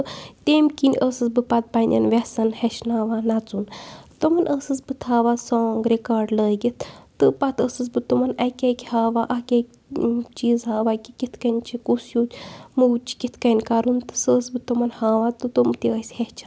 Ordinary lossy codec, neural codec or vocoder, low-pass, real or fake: none; none; none; real